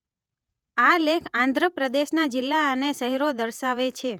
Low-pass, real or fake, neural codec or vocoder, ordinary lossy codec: 19.8 kHz; fake; vocoder, 48 kHz, 128 mel bands, Vocos; none